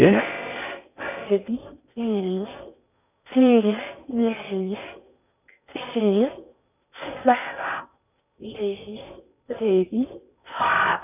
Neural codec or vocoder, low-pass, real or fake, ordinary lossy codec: codec, 16 kHz in and 24 kHz out, 0.6 kbps, FocalCodec, streaming, 4096 codes; 3.6 kHz; fake; none